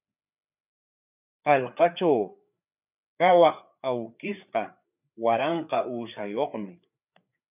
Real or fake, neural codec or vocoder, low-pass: fake; codec, 16 kHz, 4 kbps, FreqCodec, larger model; 3.6 kHz